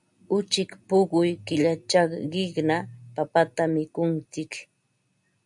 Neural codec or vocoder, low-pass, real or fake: none; 10.8 kHz; real